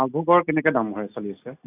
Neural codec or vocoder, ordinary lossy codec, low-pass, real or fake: none; none; 3.6 kHz; real